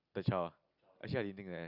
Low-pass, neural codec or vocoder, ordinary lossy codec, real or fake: 5.4 kHz; none; Opus, 64 kbps; real